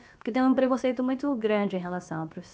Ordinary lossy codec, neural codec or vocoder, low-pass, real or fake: none; codec, 16 kHz, about 1 kbps, DyCAST, with the encoder's durations; none; fake